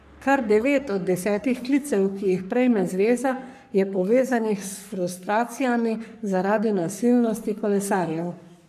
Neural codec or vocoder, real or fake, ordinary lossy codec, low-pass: codec, 44.1 kHz, 3.4 kbps, Pupu-Codec; fake; none; 14.4 kHz